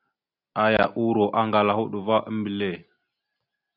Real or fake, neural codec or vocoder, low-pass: real; none; 5.4 kHz